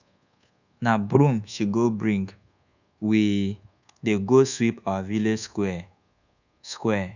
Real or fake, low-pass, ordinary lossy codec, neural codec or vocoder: fake; 7.2 kHz; none; codec, 24 kHz, 1.2 kbps, DualCodec